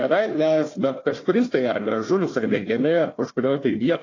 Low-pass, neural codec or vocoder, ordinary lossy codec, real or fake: 7.2 kHz; codec, 16 kHz, 1 kbps, FunCodec, trained on Chinese and English, 50 frames a second; AAC, 32 kbps; fake